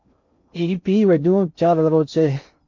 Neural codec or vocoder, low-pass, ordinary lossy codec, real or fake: codec, 16 kHz in and 24 kHz out, 0.6 kbps, FocalCodec, streaming, 2048 codes; 7.2 kHz; MP3, 48 kbps; fake